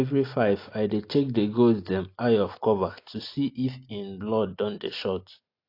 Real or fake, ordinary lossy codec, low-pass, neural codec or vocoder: real; AAC, 32 kbps; 5.4 kHz; none